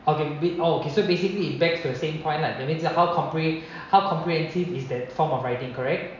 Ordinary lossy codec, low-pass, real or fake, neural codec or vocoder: none; 7.2 kHz; real; none